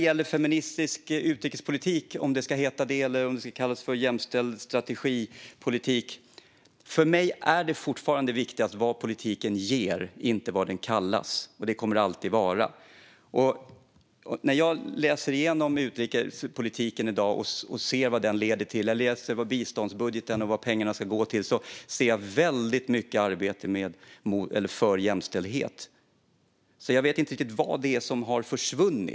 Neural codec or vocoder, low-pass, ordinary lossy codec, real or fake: none; none; none; real